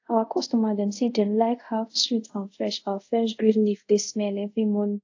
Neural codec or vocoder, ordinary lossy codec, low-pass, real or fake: codec, 24 kHz, 0.5 kbps, DualCodec; AAC, 48 kbps; 7.2 kHz; fake